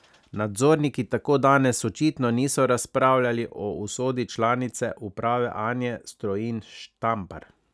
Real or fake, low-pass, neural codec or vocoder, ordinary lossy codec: real; none; none; none